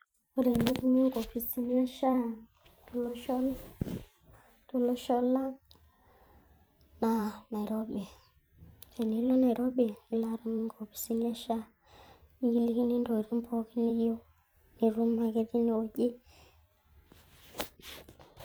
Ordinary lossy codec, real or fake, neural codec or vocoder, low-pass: none; fake; vocoder, 44.1 kHz, 128 mel bands every 512 samples, BigVGAN v2; none